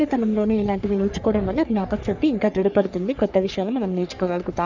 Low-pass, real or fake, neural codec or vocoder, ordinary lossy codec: 7.2 kHz; fake; codec, 44.1 kHz, 3.4 kbps, Pupu-Codec; none